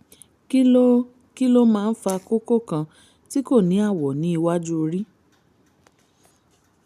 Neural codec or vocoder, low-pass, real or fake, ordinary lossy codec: none; 14.4 kHz; real; none